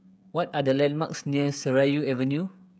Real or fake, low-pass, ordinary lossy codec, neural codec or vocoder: fake; none; none; codec, 16 kHz, 16 kbps, FreqCodec, smaller model